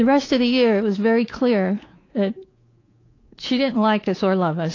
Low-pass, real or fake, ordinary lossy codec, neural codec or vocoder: 7.2 kHz; fake; AAC, 32 kbps; codec, 24 kHz, 3.1 kbps, DualCodec